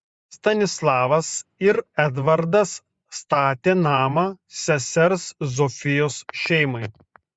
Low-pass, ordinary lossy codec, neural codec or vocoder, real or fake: 9.9 kHz; Opus, 64 kbps; vocoder, 24 kHz, 100 mel bands, Vocos; fake